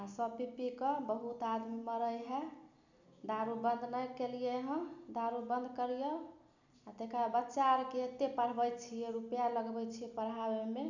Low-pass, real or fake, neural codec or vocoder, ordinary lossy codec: 7.2 kHz; real; none; none